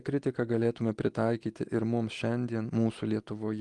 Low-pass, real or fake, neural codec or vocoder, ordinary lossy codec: 10.8 kHz; real; none; Opus, 24 kbps